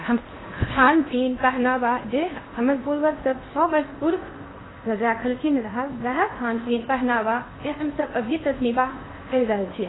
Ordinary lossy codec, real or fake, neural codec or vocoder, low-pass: AAC, 16 kbps; fake; codec, 16 kHz in and 24 kHz out, 0.8 kbps, FocalCodec, streaming, 65536 codes; 7.2 kHz